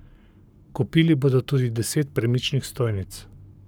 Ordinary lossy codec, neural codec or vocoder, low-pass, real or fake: none; codec, 44.1 kHz, 7.8 kbps, Pupu-Codec; none; fake